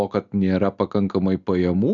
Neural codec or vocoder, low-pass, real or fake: none; 7.2 kHz; real